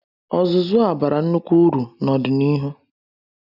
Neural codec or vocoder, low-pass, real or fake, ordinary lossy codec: none; 5.4 kHz; real; none